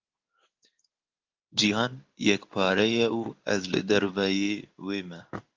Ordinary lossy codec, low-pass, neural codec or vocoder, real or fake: Opus, 32 kbps; 7.2 kHz; codec, 16 kHz in and 24 kHz out, 1 kbps, XY-Tokenizer; fake